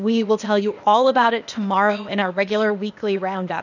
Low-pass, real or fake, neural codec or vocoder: 7.2 kHz; fake; codec, 16 kHz, 0.8 kbps, ZipCodec